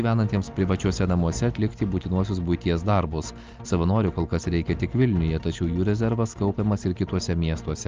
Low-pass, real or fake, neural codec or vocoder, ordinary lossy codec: 7.2 kHz; real; none; Opus, 32 kbps